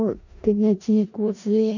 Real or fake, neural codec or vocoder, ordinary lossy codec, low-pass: fake; codec, 16 kHz in and 24 kHz out, 0.4 kbps, LongCat-Audio-Codec, four codebook decoder; none; 7.2 kHz